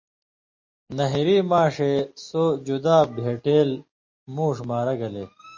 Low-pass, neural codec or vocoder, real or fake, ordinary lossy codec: 7.2 kHz; none; real; MP3, 32 kbps